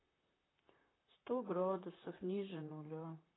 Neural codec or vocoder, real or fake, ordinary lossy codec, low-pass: vocoder, 44.1 kHz, 128 mel bands, Pupu-Vocoder; fake; AAC, 16 kbps; 7.2 kHz